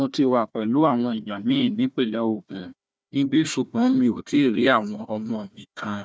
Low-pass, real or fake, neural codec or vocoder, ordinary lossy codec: none; fake; codec, 16 kHz, 1 kbps, FunCodec, trained on Chinese and English, 50 frames a second; none